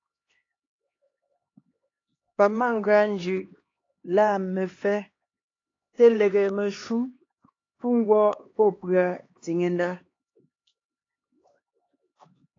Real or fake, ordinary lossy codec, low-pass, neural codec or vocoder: fake; AAC, 32 kbps; 7.2 kHz; codec, 16 kHz, 2 kbps, X-Codec, HuBERT features, trained on LibriSpeech